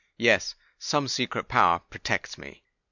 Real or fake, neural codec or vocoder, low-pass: real; none; 7.2 kHz